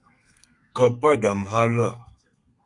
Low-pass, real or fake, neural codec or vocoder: 10.8 kHz; fake; codec, 32 kHz, 1.9 kbps, SNAC